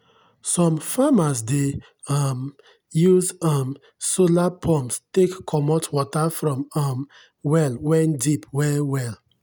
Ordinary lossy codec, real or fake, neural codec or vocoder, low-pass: none; real; none; none